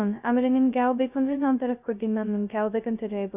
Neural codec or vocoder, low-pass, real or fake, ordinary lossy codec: codec, 16 kHz, 0.2 kbps, FocalCodec; 3.6 kHz; fake; none